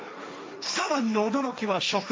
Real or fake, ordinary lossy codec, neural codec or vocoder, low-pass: fake; none; codec, 16 kHz, 1.1 kbps, Voila-Tokenizer; none